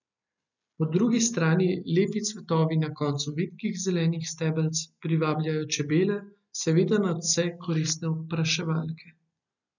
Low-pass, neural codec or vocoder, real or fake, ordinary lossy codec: 7.2 kHz; none; real; none